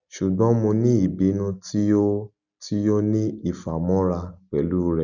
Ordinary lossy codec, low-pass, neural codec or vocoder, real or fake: none; 7.2 kHz; none; real